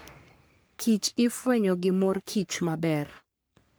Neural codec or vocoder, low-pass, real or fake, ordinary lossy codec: codec, 44.1 kHz, 3.4 kbps, Pupu-Codec; none; fake; none